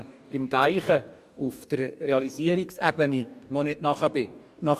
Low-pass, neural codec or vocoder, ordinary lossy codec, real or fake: 14.4 kHz; codec, 44.1 kHz, 2.6 kbps, DAC; MP3, 96 kbps; fake